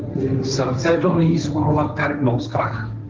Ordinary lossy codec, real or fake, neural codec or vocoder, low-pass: Opus, 32 kbps; fake; codec, 16 kHz, 1.1 kbps, Voila-Tokenizer; 7.2 kHz